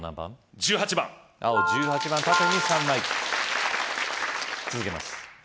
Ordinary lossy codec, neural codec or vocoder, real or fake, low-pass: none; none; real; none